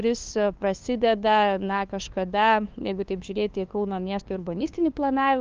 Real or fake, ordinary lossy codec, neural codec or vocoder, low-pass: fake; Opus, 32 kbps; codec, 16 kHz, 2 kbps, FunCodec, trained on LibriTTS, 25 frames a second; 7.2 kHz